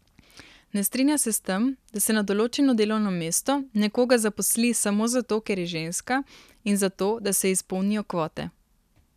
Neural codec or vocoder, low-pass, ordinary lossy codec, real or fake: none; 14.4 kHz; none; real